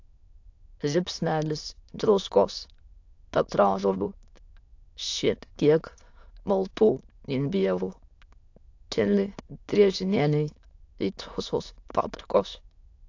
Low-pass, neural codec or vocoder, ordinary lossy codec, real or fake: 7.2 kHz; autoencoder, 22.05 kHz, a latent of 192 numbers a frame, VITS, trained on many speakers; AAC, 48 kbps; fake